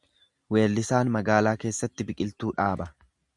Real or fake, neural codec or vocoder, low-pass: real; none; 10.8 kHz